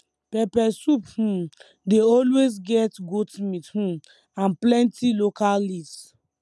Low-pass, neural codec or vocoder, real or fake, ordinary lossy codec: none; none; real; none